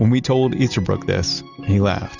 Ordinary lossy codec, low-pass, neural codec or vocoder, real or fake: Opus, 64 kbps; 7.2 kHz; none; real